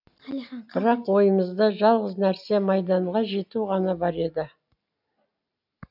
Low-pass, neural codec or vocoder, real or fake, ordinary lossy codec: 5.4 kHz; none; real; AAC, 48 kbps